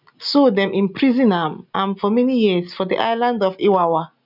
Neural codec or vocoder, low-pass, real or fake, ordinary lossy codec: none; 5.4 kHz; real; none